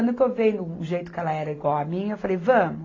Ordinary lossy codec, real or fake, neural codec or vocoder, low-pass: AAC, 32 kbps; real; none; 7.2 kHz